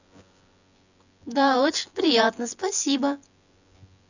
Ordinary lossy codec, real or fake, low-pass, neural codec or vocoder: none; fake; 7.2 kHz; vocoder, 24 kHz, 100 mel bands, Vocos